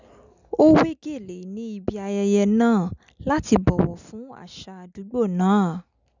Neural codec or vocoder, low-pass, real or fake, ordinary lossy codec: none; 7.2 kHz; real; none